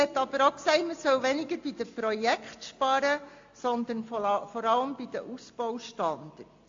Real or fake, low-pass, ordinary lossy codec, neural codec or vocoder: real; 7.2 kHz; AAC, 48 kbps; none